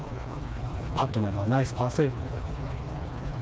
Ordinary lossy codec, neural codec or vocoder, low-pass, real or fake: none; codec, 16 kHz, 2 kbps, FreqCodec, smaller model; none; fake